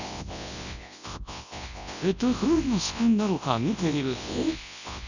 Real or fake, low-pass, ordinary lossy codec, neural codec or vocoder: fake; 7.2 kHz; none; codec, 24 kHz, 0.9 kbps, WavTokenizer, large speech release